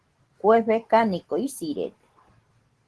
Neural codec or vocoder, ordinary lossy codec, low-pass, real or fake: none; Opus, 16 kbps; 10.8 kHz; real